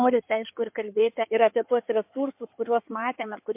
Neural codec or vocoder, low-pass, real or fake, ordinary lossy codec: codec, 16 kHz in and 24 kHz out, 2.2 kbps, FireRedTTS-2 codec; 3.6 kHz; fake; MP3, 32 kbps